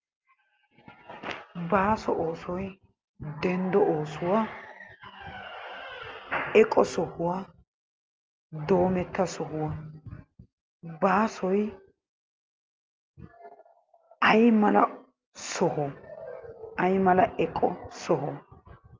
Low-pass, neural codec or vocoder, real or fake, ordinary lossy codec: 7.2 kHz; none; real; Opus, 24 kbps